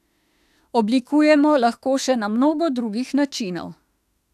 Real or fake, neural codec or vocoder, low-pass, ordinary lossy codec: fake; autoencoder, 48 kHz, 32 numbers a frame, DAC-VAE, trained on Japanese speech; 14.4 kHz; none